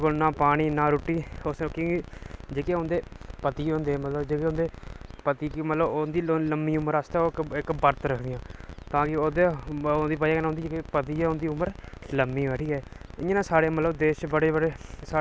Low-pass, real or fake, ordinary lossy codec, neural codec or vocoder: none; real; none; none